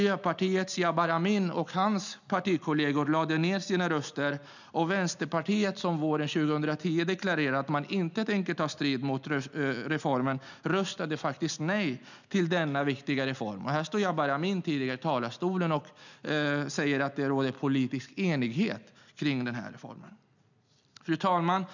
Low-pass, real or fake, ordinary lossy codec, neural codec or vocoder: 7.2 kHz; real; none; none